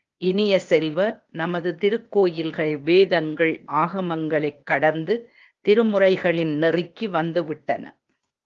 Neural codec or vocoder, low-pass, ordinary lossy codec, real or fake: codec, 16 kHz, 0.8 kbps, ZipCodec; 7.2 kHz; Opus, 32 kbps; fake